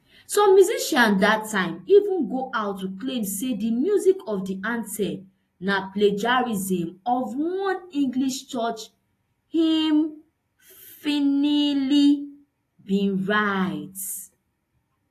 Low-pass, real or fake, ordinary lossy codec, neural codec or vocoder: 14.4 kHz; real; AAC, 48 kbps; none